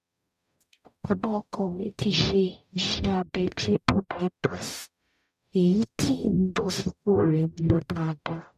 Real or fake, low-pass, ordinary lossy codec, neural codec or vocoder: fake; 14.4 kHz; none; codec, 44.1 kHz, 0.9 kbps, DAC